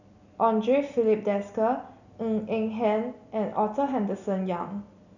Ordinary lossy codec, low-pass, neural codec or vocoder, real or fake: none; 7.2 kHz; none; real